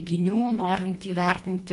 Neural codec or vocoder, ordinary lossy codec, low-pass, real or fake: codec, 24 kHz, 1.5 kbps, HILCodec; AAC, 48 kbps; 10.8 kHz; fake